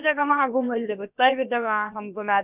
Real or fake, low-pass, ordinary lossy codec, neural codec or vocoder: fake; 3.6 kHz; none; codec, 16 kHz, about 1 kbps, DyCAST, with the encoder's durations